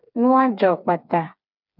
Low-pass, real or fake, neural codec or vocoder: 5.4 kHz; fake; codec, 16 kHz, 4 kbps, FreqCodec, smaller model